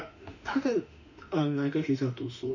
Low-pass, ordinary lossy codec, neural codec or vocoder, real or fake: 7.2 kHz; none; autoencoder, 48 kHz, 32 numbers a frame, DAC-VAE, trained on Japanese speech; fake